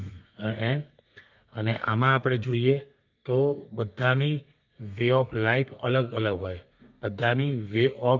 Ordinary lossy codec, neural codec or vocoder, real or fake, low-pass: Opus, 24 kbps; codec, 32 kHz, 1.9 kbps, SNAC; fake; 7.2 kHz